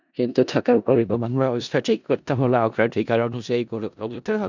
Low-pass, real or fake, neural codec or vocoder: 7.2 kHz; fake; codec, 16 kHz in and 24 kHz out, 0.4 kbps, LongCat-Audio-Codec, four codebook decoder